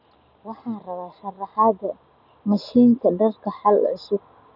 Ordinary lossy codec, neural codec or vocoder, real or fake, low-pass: none; none; real; 5.4 kHz